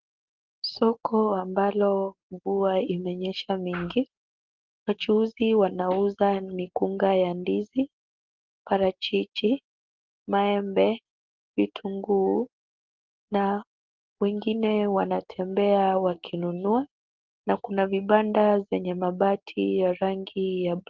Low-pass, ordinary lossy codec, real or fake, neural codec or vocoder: 7.2 kHz; Opus, 16 kbps; real; none